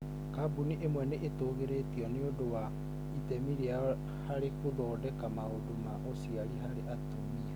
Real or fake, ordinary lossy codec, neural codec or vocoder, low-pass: real; none; none; none